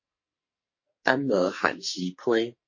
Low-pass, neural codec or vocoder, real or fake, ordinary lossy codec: 7.2 kHz; codec, 44.1 kHz, 2.6 kbps, SNAC; fake; MP3, 32 kbps